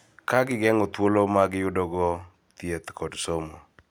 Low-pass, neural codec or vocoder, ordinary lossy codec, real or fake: none; none; none; real